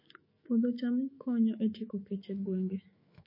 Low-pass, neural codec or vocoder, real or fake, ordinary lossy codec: 5.4 kHz; autoencoder, 48 kHz, 128 numbers a frame, DAC-VAE, trained on Japanese speech; fake; MP3, 24 kbps